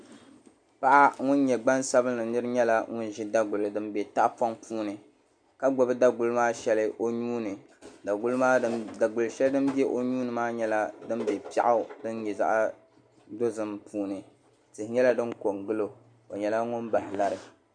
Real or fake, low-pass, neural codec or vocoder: real; 9.9 kHz; none